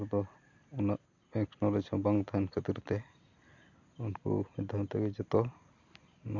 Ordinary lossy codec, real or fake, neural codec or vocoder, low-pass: Opus, 64 kbps; real; none; 7.2 kHz